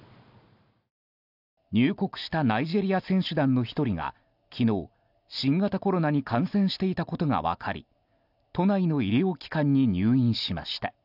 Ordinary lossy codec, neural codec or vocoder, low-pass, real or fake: none; none; 5.4 kHz; real